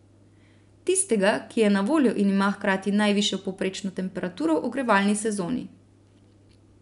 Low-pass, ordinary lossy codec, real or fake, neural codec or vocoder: 10.8 kHz; none; real; none